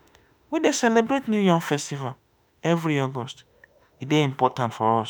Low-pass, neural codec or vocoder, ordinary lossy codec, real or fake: none; autoencoder, 48 kHz, 32 numbers a frame, DAC-VAE, trained on Japanese speech; none; fake